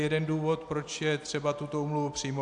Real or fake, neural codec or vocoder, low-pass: real; none; 10.8 kHz